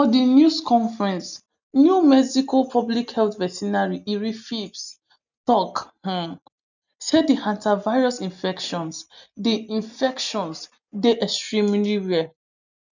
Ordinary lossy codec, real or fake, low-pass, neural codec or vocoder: none; real; 7.2 kHz; none